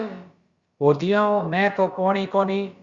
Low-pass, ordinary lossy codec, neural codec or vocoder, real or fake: 7.2 kHz; Opus, 64 kbps; codec, 16 kHz, about 1 kbps, DyCAST, with the encoder's durations; fake